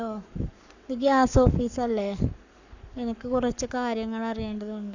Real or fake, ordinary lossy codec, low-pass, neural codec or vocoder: fake; none; 7.2 kHz; codec, 44.1 kHz, 7.8 kbps, Pupu-Codec